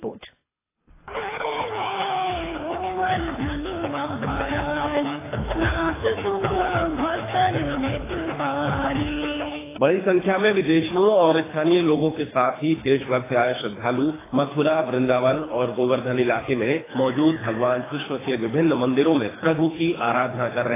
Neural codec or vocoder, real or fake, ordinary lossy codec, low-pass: codec, 24 kHz, 3 kbps, HILCodec; fake; AAC, 16 kbps; 3.6 kHz